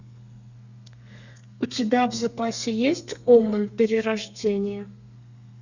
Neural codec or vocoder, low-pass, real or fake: codec, 32 kHz, 1.9 kbps, SNAC; 7.2 kHz; fake